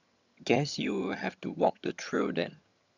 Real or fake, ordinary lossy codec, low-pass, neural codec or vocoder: fake; none; 7.2 kHz; vocoder, 22.05 kHz, 80 mel bands, HiFi-GAN